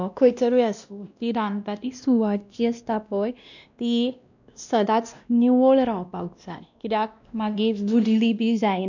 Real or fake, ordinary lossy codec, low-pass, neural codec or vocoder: fake; none; 7.2 kHz; codec, 16 kHz, 1 kbps, X-Codec, WavLM features, trained on Multilingual LibriSpeech